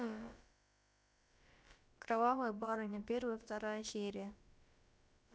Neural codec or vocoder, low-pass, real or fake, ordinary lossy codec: codec, 16 kHz, about 1 kbps, DyCAST, with the encoder's durations; none; fake; none